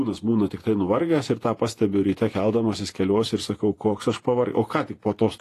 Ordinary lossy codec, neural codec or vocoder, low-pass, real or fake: AAC, 48 kbps; vocoder, 48 kHz, 128 mel bands, Vocos; 14.4 kHz; fake